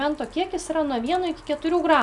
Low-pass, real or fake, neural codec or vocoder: 10.8 kHz; real; none